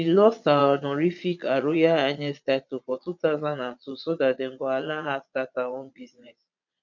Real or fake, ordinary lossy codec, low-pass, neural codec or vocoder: fake; none; 7.2 kHz; vocoder, 22.05 kHz, 80 mel bands, Vocos